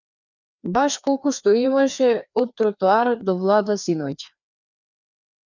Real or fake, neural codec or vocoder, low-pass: fake; codec, 16 kHz, 2 kbps, FreqCodec, larger model; 7.2 kHz